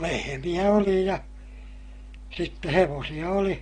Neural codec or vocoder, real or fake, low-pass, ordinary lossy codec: none; real; 9.9 kHz; AAC, 32 kbps